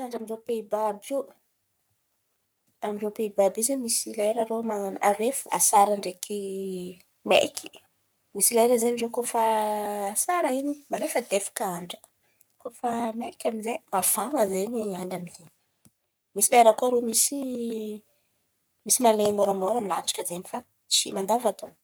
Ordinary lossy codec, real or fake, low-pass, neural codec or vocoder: none; fake; none; codec, 44.1 kHz, 3.4 kbps, Pupu-Codec